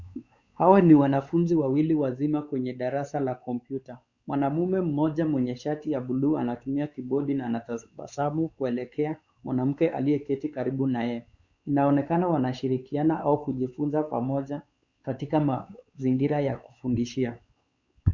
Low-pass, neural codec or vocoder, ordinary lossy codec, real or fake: 7.2 kHz; codec, 16 kHz, 4 kbps, X-Codec, WavLM features, trained on Multilingual LibriSpeech; Opus, 64 kbps; fake